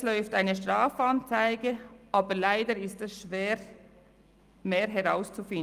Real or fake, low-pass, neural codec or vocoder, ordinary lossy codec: real; 14.4 kHz; none; Opus, 32 kbps